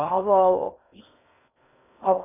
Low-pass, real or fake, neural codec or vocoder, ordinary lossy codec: 3.6 kHz; fake; codec, 16 kHz in and 24 kHz out, 0.6 kbps, FocalCodec, streaming, 2048 codes; none